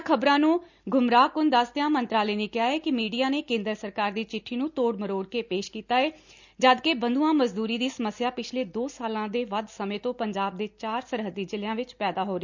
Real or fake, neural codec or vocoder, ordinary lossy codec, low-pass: real; none; none; 7.2 kHz